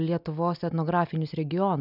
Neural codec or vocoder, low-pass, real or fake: none; 5.4 kHz; real